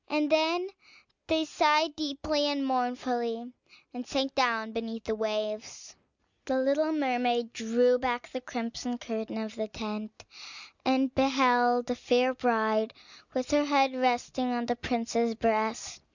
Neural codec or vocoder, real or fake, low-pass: none; real; 7.2 kHz